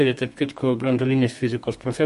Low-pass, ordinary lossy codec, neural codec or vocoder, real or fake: 14.4 kHz; MP3, 48 kbps; codec, 32 kHz, 1.9 kbps, SNAC; fake